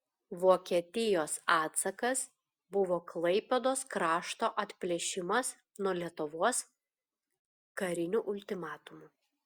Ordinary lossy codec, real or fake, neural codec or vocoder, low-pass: Opus, 64 kbps; real; none; 19.8 kHz